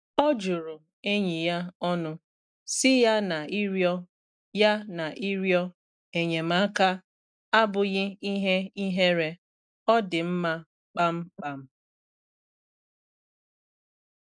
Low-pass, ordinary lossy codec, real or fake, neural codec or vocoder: 9.9 kHz; none; real; none